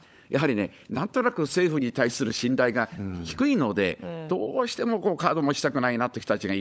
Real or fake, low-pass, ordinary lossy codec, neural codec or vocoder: fake; none; none; codec, 16 kHz, 16 kbps, FunCodec, trained on LibriTTS, 50 frames a second